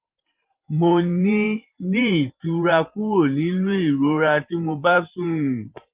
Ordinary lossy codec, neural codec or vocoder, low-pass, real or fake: Opus, 32 kbps; vocoder, 44.1 kHz, 128 mel bands every 512 samples, BigVGAN v2; 3.6 kHz; fake